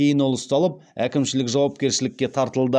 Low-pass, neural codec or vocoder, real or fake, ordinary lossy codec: none; none; real; none